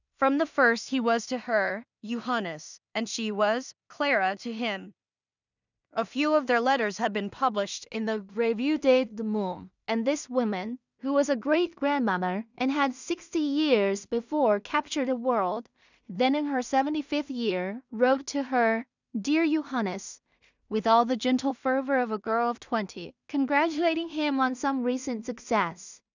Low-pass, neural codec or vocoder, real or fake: 7.2 kHz; codec, 16 kHz in and 24 kHz out, 0.4 kbps, LongCat-Audio-Codec, two codebook decoder; fake